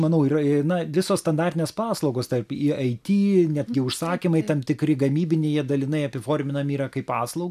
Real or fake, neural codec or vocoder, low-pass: real; none; 14.4 kHz